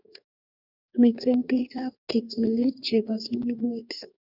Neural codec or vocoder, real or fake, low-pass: codec, 44.1 kHz, 2.6 kbps, DAC; fake; 5.4 kHz